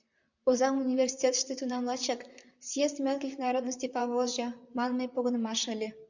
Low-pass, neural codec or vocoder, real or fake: 7.2 kHz; vocoder, 44.1 kHz, 128 mel bands, Pupu-Vocoder; fake